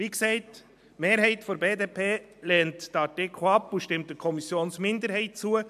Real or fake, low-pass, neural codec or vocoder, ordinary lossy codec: real; 14.4 kHz; none; MP3, 96 kbps